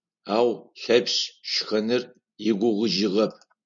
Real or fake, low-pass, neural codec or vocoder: real; 7.2 kHz; none